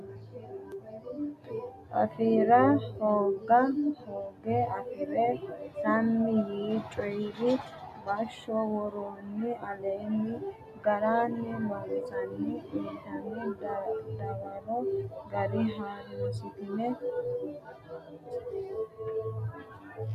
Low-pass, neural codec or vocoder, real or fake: 14.4 kHz; none; real